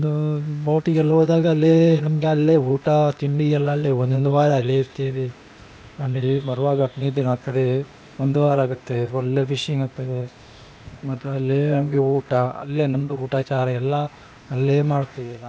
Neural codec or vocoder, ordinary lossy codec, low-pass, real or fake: codec, 16 kHz, 0.8 kbps, ZipCodec; none; none; fake